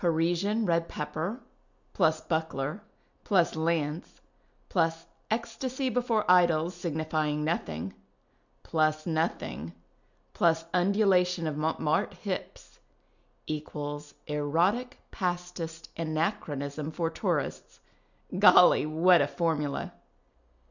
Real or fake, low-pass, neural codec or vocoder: real; 7.2 kHz; none